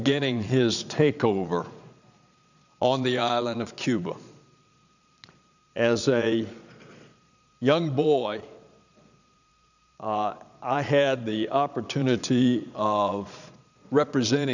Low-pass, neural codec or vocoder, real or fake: 7.2 kHz; vocoder, 22.05 kHz, 80 mel bands, Vocos; fake